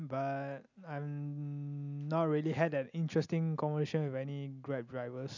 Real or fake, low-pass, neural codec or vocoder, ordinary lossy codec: real; 7.2 kHz; none; none